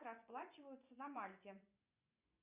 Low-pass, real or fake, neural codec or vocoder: 3.6 kHz; fake; vocoder, 24 kHz, 100 mel bands, Vocos